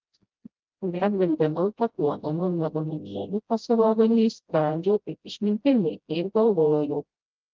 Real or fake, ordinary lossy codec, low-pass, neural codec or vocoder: fake; Opus, 32 kbps; 7.2 kHz; codec, 16 kHz, 0.5 kbps, FreqCodec, smaller model